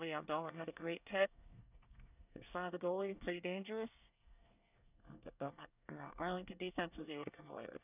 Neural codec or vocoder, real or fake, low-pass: codec, 24 kHz, 1 kbps, SNAC; fake; 3.6 kHz